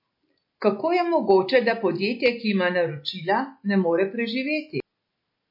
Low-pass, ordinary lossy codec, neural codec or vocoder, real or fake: 5.4 kHz; MP3, 32 kbps; none; real